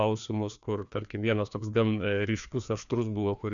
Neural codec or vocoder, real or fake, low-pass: codec, 16 kHz, 2 kbps, FreqCodec, larger model; fake; 7.2 kHz